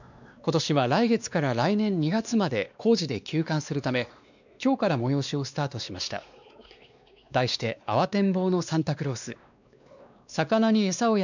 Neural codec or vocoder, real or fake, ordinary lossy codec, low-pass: codec, 16 kHz, 2 kbps, X-Codec, WavLM features, trained on Multilingual LibriSpeech; fake; none; 7.2 kHz